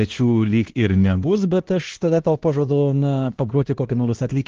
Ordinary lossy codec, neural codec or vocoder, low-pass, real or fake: Opus, 16 kbps; codec, 16 kHz, 1 kbps, X-Codec, HuBERT features, trained on LibriSpeech; 7.2 kHz; fake